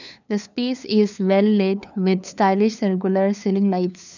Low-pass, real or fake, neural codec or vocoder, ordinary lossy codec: 7.2 kHz; fake; codec, 16 kHz, 2 kbps, FunCodec, trained on LibriTTS, 25 frames a second; none